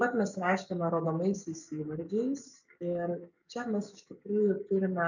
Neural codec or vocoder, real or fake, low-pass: codec, 44.1 kHz, 7.8 kbps, DAC; fake; 7.2 kHz